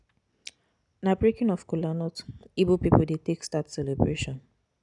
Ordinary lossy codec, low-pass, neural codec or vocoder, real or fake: none; 10.8 kHz; none; real